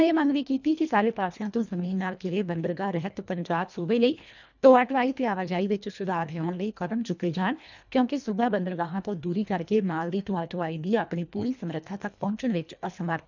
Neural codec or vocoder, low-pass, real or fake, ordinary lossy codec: codec, 24 kHz, 1.5 kbps, HILCodec; 7.2 kHz; fake; none